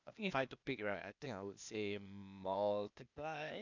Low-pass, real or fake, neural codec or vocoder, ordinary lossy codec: 7.2 kHz; fake; codec, 16 kHz, 0.8 kbps, ZipCodec; none